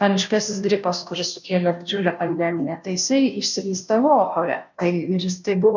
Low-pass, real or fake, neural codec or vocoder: 7.2 kHz; fake; codec, 16 kHz, 0.5 kbps, FunCodec, trained on Chinese and English, 25 frames a second